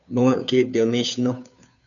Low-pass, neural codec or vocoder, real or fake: 7.2 kHz; codec, 16 kHz, 2 kbps, FunCodec, trained on Chinese and English, 25 frames a second; fake